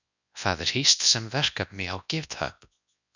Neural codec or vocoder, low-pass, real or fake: codec, 24 kHz, 0.9 kbps, WavTokenizer, large speech release; 7.2 kHz; fake